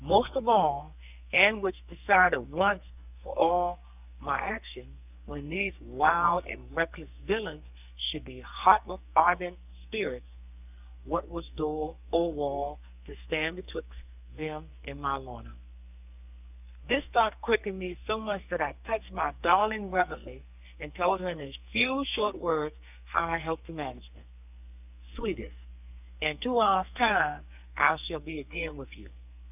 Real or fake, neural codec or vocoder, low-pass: fake; codec, 44.1 kHz, 2.6 kbps, SNAC; 3.6 kHz